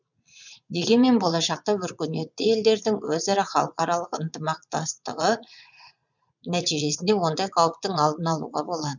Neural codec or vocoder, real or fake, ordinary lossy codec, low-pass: vocoder, 22.05 kHz, 80 mel bands, Vocos; fake; none; 7.2 kHz